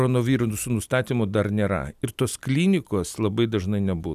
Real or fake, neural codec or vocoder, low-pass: real; none; 14.4 kHz